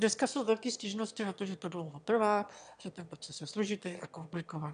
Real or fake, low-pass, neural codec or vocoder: fake; 9.9 kHz; autoencoder, 22.05 kHz, a latent of 192 numbers a frame, VITS, trained on one speaker